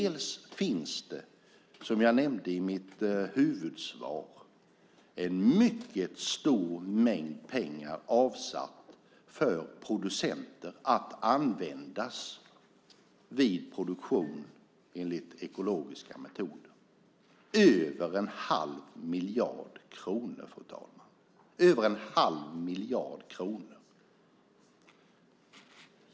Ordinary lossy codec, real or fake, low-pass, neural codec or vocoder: none; real; none; none